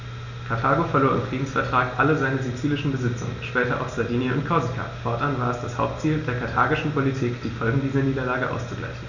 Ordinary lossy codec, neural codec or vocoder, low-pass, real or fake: none; none; 7.2 kHz; real